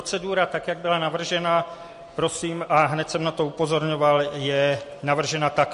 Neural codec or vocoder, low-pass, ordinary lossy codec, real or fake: none; 10.8 kHz; MP3, 48 kbps; real